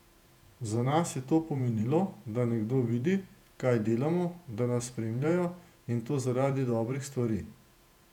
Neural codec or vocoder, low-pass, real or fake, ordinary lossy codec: vocoder, 48 kHz, 128 mel bands, Vocos; 19.8 kHz; fake; none